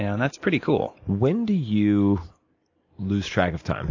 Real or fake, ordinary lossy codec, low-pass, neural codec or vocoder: real; AAC, 48 kbps; 7.2 kHz; none